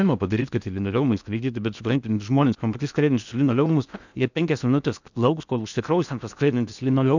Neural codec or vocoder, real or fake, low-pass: codec, 16 kHz in and 24 kHz out, 0.8 kbps, FocalCodec, streaming, 65536 codes; fake; 7.2 kHz